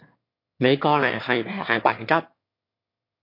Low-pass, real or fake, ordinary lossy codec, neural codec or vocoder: 5.4 kHz; fake; MP3, 32 kbps; autoencoder, 22.05 kHz, a latent of 192 numbers a frame, VITS, trained on one speaker